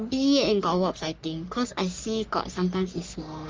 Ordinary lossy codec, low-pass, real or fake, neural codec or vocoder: Opus, 24 kbps; 7.2 kHz; fake; codec, 44.1 kHz, 3.4 kbps, Pupu-Codec